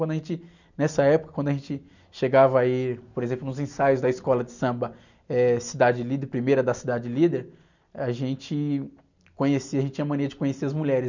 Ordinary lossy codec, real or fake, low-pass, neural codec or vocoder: none; real; 7.2 kHz; none